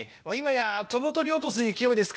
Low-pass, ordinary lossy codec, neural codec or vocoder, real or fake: none; none; codec, 16 kHz, 0.8 kbps, ZipCodec; fake